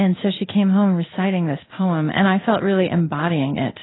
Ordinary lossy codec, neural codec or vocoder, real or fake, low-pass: AAC, 16 kbps; codec, 16 kHz in and 24 kHz out, 1 kbps, XY-Tokenizer; fake; 7.2 kHz